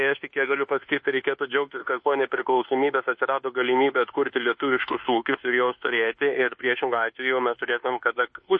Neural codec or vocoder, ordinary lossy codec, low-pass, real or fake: codec, 24 kHz, 1.2 kbps, DualCodec; MP3, 32 kbps; 9.9 kHz; fake